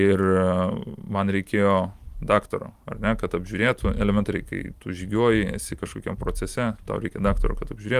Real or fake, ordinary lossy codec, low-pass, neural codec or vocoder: real; Opus, 32 kbps; 14.4 kHz; none